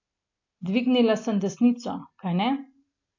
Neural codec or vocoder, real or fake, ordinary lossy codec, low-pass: none; real; AAC, 48 kbps; 7.2 kHz